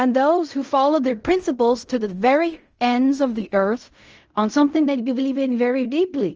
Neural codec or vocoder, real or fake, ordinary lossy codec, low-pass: codec, 16 kHz in and 24 kHz out, 0.4 kbps, LongCat-Audio-Codec, fine tuned four codebook decoder; fake; Opus, 24 kbps; 7.2 kHz